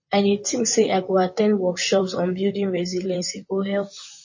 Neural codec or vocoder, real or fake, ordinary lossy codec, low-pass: vocoder, 44.1 kHz, 128 mel bands, Pupu-Vocoder; fake; MP3, 32 kbps; 7.2 kHz